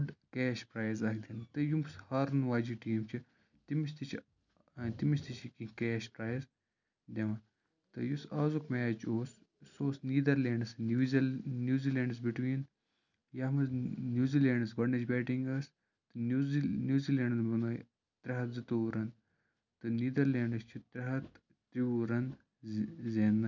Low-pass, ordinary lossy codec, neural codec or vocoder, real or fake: 7.2 kHz; none; none; real